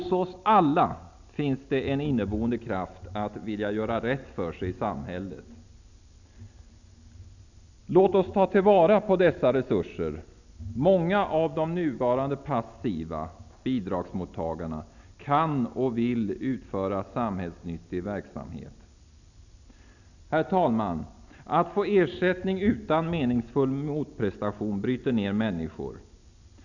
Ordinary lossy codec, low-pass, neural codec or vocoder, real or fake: none; 7.2 kHz; none; real